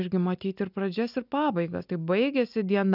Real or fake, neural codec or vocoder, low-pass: real; none; 5.4 kHz